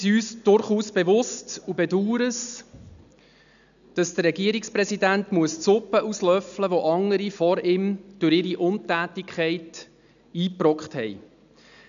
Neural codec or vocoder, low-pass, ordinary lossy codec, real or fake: none; 7.2 kHz; none; real